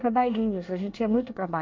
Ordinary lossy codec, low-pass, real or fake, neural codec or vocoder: MP3, 48 kbps; 7.2 kHz; fake; codec, 24 kHz, 1 kbps, SNAC